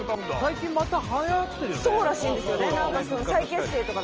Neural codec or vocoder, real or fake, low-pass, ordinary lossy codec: none; real; 7.2 kHz; Opus, 24 kbps